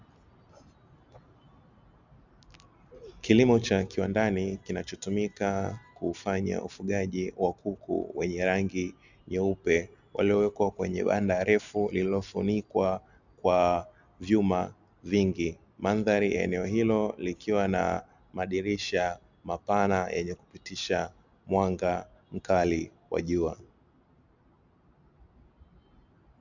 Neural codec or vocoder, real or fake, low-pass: none; real; 7.2 kHz